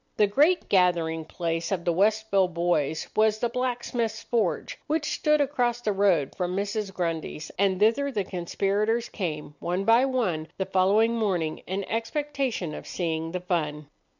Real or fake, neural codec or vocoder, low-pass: real; none; 7.2 kHz